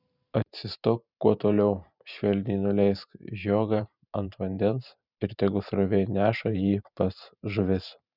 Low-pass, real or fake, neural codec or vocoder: 5.4 kHz; fake; vocoder, 44.1 kHz, 128 mel bands every 512 samples, BigVGAN v2